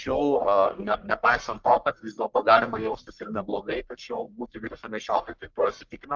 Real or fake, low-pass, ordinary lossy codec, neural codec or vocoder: fake; 7.2 kHz; Opus, 32 kbps; codec, 44.1 kHz, 1.7 kbps, Pupu-Codec